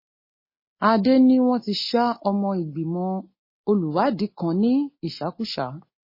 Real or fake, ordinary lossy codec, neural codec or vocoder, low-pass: real; MP3, 24 kbps; none; 5.4 kHz